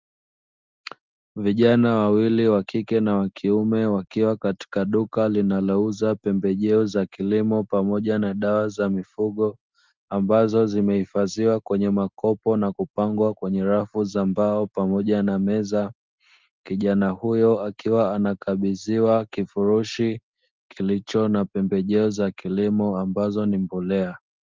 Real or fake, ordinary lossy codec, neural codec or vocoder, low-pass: real; Opus, 32 kbps; none; 7.2 kHz